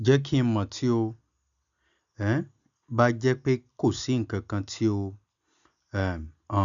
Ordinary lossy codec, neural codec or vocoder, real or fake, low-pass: none; none; real; 7.2 kHz